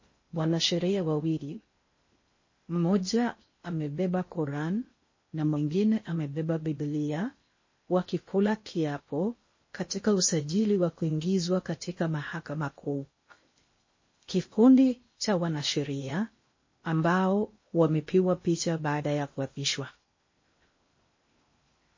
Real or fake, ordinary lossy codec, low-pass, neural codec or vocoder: fake; MP3, 32 kbps; 7.2 kHz; codec, 16 kHz in and 24 kHz out, 0.6 kbps, FocalCodec, streaming, 2048 codes